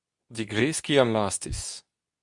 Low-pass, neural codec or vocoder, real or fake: 10.8 kHz; codec, 24 kHz, 0.9 kbps, WavTokenizer, medium speech release version 2; fake